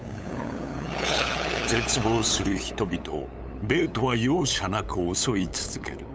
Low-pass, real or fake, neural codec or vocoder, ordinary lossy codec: none; fake; codec, 16 kHz, 8 kbps, FunCodec, trained on LibriTTS, 25 frames a second; none